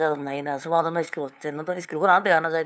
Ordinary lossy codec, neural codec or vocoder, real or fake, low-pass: none; codec, 16 kHz, 2 kbps, FunCodec, trained on LibriTTS, 25 frames a second; fake; none